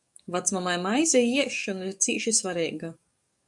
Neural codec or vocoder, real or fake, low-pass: codec, 44.1 kHz, 7.8 kbps, DAC; fake; 10.8 kHz